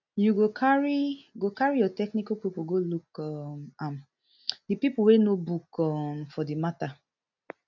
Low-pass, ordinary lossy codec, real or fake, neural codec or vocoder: 7.2 kHz; none; real; none